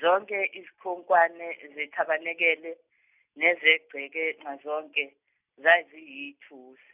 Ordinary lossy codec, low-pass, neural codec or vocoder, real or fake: none; 3.6 kHz; none; real